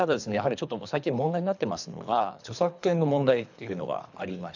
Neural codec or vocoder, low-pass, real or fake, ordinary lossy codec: codec, 24 kHz, 3 kbps, HILCodec; 7.2 kHz; fake; none